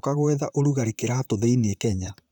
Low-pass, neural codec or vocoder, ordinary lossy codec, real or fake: 19.8 kHz; vocoder, 44.1 kHz, 128 mel bands, Pupu-Vocoder; none; fake